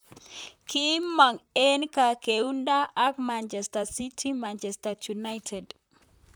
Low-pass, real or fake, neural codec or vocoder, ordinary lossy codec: none; fake; vocoder, 44.1 kHz, 128 mel bands, Pupu-Vocoder; none